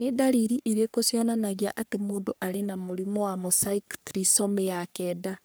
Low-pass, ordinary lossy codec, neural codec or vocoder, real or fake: none; none; codec, 44.1 kHz, 3.4 kbps, Pupu-Codec; fake